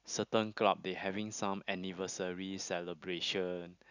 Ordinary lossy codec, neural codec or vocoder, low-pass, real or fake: none; none; 7.2 kHz; real